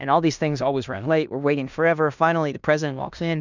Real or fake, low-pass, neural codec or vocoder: fake; 7.2 kHz; codec, 16 kHz in and 24 kHz out, 0.9 kbps, LongCat-Audio-Codec, fine tuned four codebook decoder